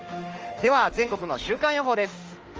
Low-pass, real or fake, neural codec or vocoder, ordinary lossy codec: 7.2 kHz; fake; autoencoder, 48 kHz, 32 numbers a frame, DAC-VAE, trained on Japanese speech; Opus, 24 kbps